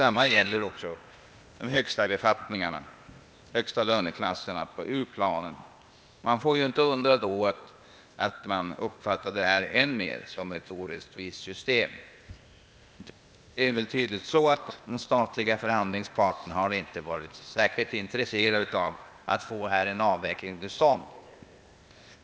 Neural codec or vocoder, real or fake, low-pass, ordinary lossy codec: codec, 16 kHz, 0.8 kbps, ZipCodec; fake; none; none